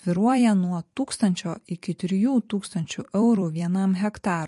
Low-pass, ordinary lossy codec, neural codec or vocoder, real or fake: 14.4 kHz; MP3, 48 kbps; vocoder, 44.1 kHz, 128 mel bands every 256 samples, BigVGAN v2; fake